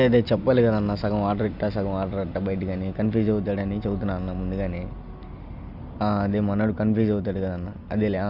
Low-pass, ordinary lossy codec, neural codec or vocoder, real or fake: 5.4 kHz; none; none; real